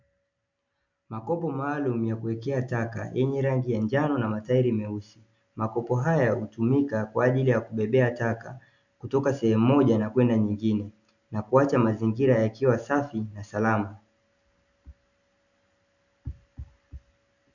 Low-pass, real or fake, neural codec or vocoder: 7.2 kHz; real; none